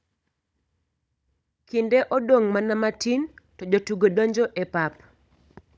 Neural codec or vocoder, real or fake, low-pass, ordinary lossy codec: codec, 16 kHz, 16 kbps, FunCodec, trained on Chinese and English, 50 frames a second; fake; none; none